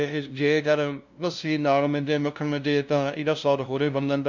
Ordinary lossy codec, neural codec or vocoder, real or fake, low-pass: none; codec, 16 kHz, 0.5 kbps, FunCodec, trained on LibriTTS, 25 frames a second; fake; 7.2 kHz